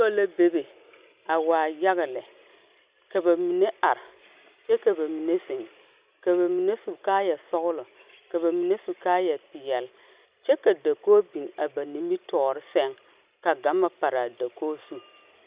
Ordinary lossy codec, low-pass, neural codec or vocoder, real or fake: Opus, 64 kbps; 3.6 kHz; none; real